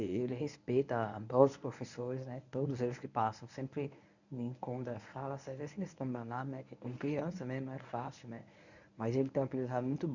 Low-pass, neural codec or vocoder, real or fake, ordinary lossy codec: 7.2 kHz; codec, 24 kHz, 0.9 kbps, WavTokenizer, medium speech release version 1; fake; none